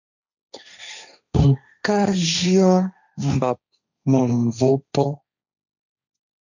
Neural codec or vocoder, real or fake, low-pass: codec, 16 kHz, 1.1 kbps, Voila-Tokenizer; fake; 7.2 kHz